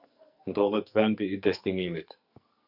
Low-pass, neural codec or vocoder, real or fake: 5.4 kHz; codec, 44.1 kHz, 2.6 kbps, SNAC; fake